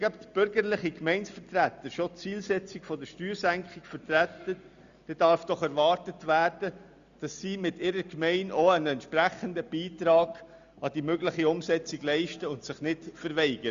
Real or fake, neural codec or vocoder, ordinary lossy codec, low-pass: real; none; Opus, 64 kbps; 7.2 kHz